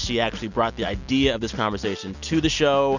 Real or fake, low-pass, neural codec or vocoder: real; 7.2 kHz; none